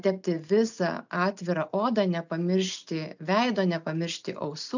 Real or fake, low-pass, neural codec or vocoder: real; 7.2 kHz; none